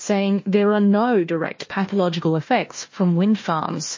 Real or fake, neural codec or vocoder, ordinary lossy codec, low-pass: fake; codec, 16 kHz, 1 kbps, FunCodec, trained on Chinese and English, 50 frames a second; MP3, 32 kbps; 7.2 kHz